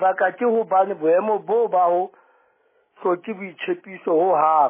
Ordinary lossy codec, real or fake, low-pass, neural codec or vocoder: MP3, 16 kbps; real; 3.6 kHz; none